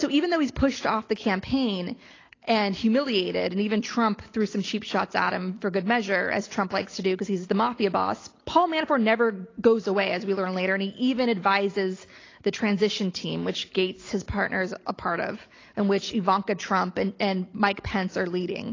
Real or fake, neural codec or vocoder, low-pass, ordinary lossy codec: real; none; 7.2 kHz; AAC, 32 kbps